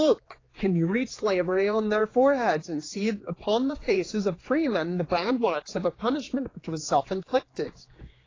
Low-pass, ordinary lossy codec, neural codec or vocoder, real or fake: 7.2 kHz; AAC, 32 kbps; codec, 16 kHz, 4 kbps, X-Codec, HuBERT features, trained on general audio; fake